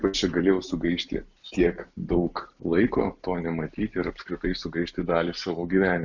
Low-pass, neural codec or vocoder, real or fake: 7.2 kHz; none; real